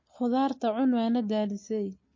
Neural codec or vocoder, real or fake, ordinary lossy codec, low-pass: none; real; MP3, 32 kbps; 7.2 kHz